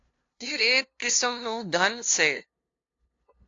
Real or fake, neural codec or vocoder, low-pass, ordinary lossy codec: fake; codec, 16 kHz, 0.5 kbps, FunCodec, trained on LibriTTS, 25 frames a second; 7.2 kHz; AAC, 48 kbps